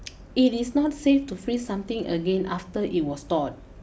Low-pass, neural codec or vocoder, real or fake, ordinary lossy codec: none; none; real; none